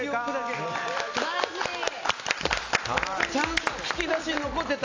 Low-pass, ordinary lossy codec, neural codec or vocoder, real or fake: 7.2 kHz; AAC, 48 kbps; none; real